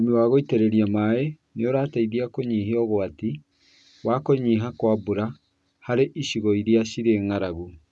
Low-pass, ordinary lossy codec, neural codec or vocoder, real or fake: none; none; none; real